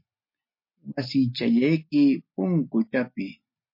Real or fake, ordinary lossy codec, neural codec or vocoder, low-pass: real; MP3, 32 kbps; none; 5.4 kHz